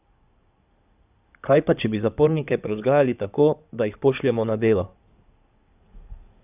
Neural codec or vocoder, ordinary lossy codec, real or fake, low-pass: codec, 16 kHz in and 24 kHz out, 2.2 kbps, FireRedTTS-2 codec; none; fake; 3.6 kHz